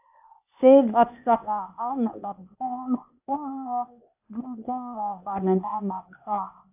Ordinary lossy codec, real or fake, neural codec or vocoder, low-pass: AAC, 32 kbps; fake; codec, 16 kHz, 0.8 kbps, ZipCodec; 3.6 kHz